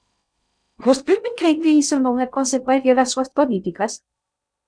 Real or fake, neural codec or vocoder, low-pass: fake; codec, 16 kHz in and 24 kHz out, 0.6 kbps, FocalCodec, streaming, 2048 codes; 9.9 kHz